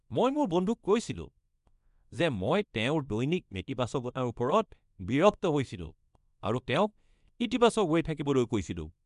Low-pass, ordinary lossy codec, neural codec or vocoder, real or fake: 10.8 kHz; none; codec, 24 kHz, 0.9 kbps, WavTokenizer, small release; fake